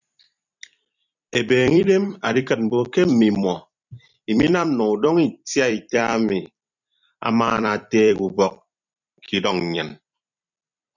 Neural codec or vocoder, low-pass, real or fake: vocoder, 44.1 kHz, 128 mel bands every 256 samples, BigVGAN v2; 7.2 kHz; fake